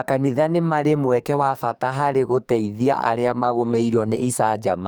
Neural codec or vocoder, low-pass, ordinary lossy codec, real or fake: codec, 44.1 kHz, 2.6 kbps, SNAC; none; none; fake